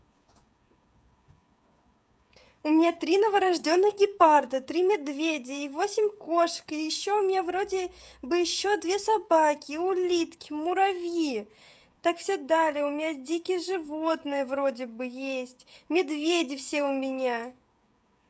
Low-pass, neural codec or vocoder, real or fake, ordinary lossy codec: none; codec, 16 kHz, 16 kbps, FreqCodec, smaller model; fake; none